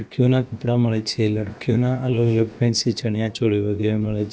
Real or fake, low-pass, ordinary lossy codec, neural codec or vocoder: fake; none; none; codec, 16 kHz, about 1 kbps, DyCAST, with the encoder's durations